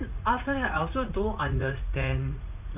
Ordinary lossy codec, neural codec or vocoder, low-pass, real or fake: none; vocoder, 44.1 kHz, 80 mel bands, Vocos; 3.6 kHz; fake